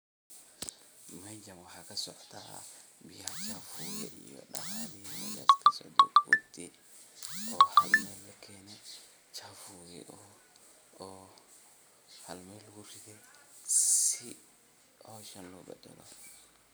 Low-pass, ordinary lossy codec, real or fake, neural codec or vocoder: none; none; real; none